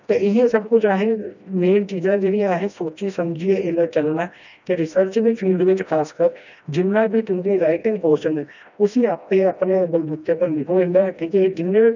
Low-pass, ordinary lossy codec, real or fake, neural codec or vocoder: 7.2 kHz; none; fake; codec, 16 kHz, 1 kbps, FreqCodec, smaller model